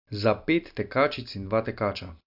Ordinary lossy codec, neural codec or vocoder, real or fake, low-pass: AAC, 48 kbps; none; real; 5.4 kHz